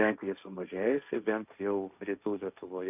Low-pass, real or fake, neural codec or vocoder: 3.6 kHz; fake; codec, 16 kHz, 1.1 kbps, Voila-Tokenizer